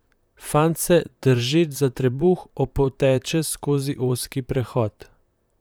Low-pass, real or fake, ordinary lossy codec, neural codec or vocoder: none; fake; none; vocoder, 44.1 kHz, 128 mel bands, Pupu-Vocoder